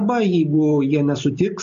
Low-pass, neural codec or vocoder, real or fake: 7.2 kHz; none; real